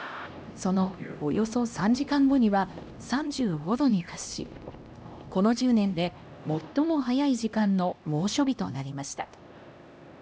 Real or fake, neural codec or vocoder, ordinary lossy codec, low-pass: fake; codec, 16 kHz, 1 kbps, X-Codec, HuBERT features, trained on LibriSpeech; none; none